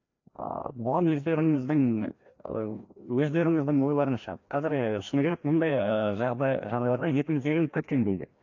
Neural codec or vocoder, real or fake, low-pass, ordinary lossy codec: codec, 16 kHz, 1 kbps, FreqCodec, larger model; fake; 7.2 kHz; AAC, 48 kbps